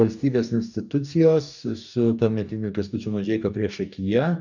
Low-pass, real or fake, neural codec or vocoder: 7.2 kHz; fake; codec, 44.1 kHz, 2.6 kbps, DAC